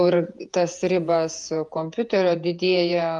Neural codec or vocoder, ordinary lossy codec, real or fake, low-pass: vocoder, 48 kHz, 128 mel bands, Vocos; Opus, 32 kbps; fake; 10.8 kHz